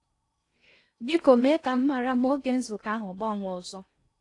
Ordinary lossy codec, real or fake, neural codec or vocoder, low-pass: AAC, 48 kbps; fake; codec, 16 kHz in and 24 kHz out, 0.6 kbps, FocalCodec, streaming, 4096 codes; 10.8 kHz